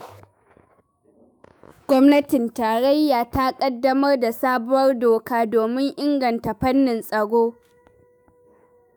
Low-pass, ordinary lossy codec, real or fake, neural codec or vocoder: none; none; fake; autoencoder, 48 kHz, 128 numbers a frame, DAC-VAE, trained on Japanese speech